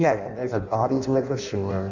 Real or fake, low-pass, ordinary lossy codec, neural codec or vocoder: fake; 7.2 kHz; Opus, 64 kbps; codec, 16 kHz in and 24 kHz out, 0.6 kbps, FireRedTTS-2 codec